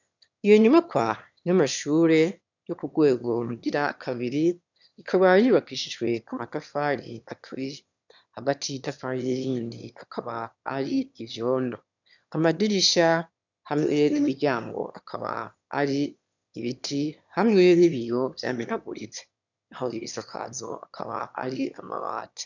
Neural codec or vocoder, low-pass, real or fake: autoencoder, 22.05 kHz, a latent of 192 numbers a frame, VITS, trained on one speaker; 7.2 kHz; fake